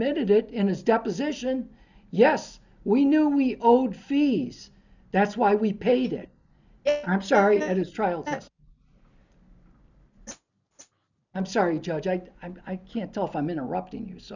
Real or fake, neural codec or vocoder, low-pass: real; none; 7.2 kHz